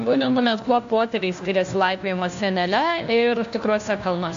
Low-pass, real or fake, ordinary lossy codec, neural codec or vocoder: 7.2 kHz; fake; AAC, 64 kbps; codec, 16 kHz, 1 kbps, FunCodec, trained on LibriTTS, 50 frames a second